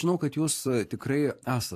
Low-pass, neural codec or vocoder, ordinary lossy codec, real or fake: 14.4 kHz; vocoder, 44.1 kHz, 128 mel bands every 256 samples, BigVGAN v2; AAC, 64 kbps; fake